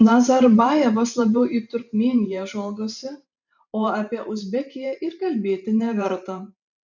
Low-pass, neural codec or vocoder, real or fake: 7.2 kHz; vocoder, 44.1 kHz, 128 mel bands every 512 samples, BigVGAN v2; fake